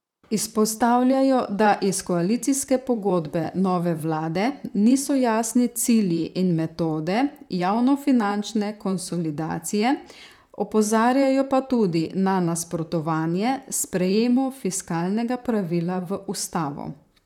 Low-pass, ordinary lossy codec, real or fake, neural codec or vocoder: 19.8 kHz; none; fake; vocoder, 44.1 kHz, 128 mel bands, Pupu-Vocoder